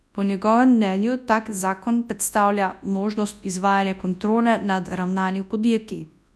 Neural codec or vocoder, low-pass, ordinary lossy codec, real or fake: codec, 24 kHz, 0.9 kbps, WavTokenizer, large speech release; none; none; fake